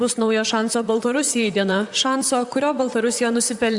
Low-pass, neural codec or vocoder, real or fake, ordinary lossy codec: 10.8 kHz; vocoder, 44.1 kHz, 128 mel bands, Pupu-Vocoder; fake; Opus, 64 kbps